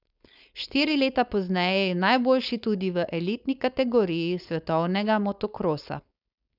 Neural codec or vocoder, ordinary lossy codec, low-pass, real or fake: codec, 16 kHz, 4.8 kbps, FACodec; none; 5.4 kHz; fake